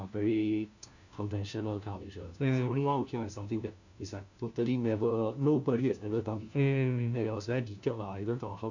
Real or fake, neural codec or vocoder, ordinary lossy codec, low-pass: fake; codec, 16 kHz, 1 kbps, FunCodec, trained on LibriTTS, 50 frames a second; none; 7.2 kHz